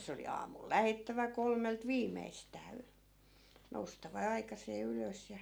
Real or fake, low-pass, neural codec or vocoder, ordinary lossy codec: real; none; none; none